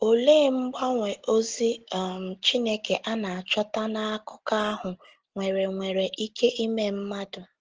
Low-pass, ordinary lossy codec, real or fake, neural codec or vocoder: 7.2 kHz; Opus, 16 kbps; real; none